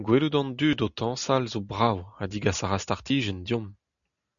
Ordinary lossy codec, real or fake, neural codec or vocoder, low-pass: MP3, 96 kbps; real; none; 7.2 kHz